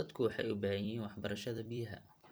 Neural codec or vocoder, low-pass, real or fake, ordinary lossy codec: vocoder, 44.1 kHz, 128 mel bands every 256 samples, BigVGAN v2; none; fake; none